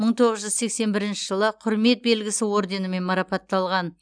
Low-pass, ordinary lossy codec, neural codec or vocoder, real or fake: 9.9 kHz; none; none; real